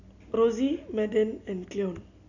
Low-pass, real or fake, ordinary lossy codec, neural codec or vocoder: 7.2 kHz; real; none; none